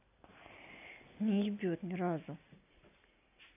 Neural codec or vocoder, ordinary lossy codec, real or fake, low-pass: none; none; real; 3.6 kHz